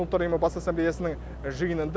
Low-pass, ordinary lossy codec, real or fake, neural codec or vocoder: none; none; real; none